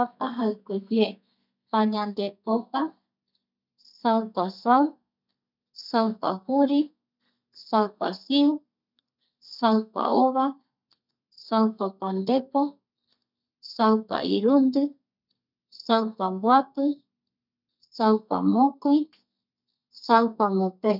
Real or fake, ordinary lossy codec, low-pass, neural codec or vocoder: fake; none; 5.4 kHz; codec, 32 kHz, 1.9 kbps, SNAC